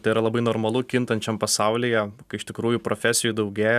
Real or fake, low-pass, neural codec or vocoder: real; 14.4 kHz; none